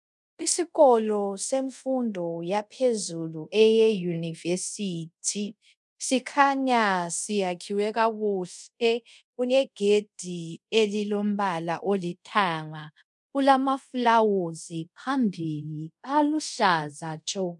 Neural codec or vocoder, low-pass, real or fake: codec, 24 kHz, 0.5 kbps, DualCodec; 10.8 kHz; fake